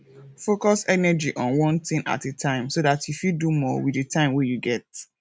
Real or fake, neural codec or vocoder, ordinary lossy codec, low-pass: real; none; none; none